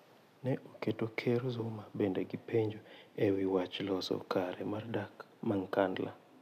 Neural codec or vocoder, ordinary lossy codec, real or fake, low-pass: none; none; real; 14.4 kHz